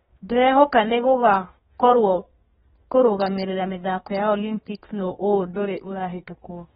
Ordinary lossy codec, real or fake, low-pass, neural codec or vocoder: AAC, 16 kbps; fake; 14.4 kHz; codec, 32 kHz, 1.9 kbps, SNAC